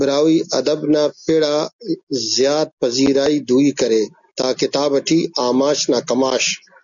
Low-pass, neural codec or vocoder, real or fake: 7.2 kHz; none; real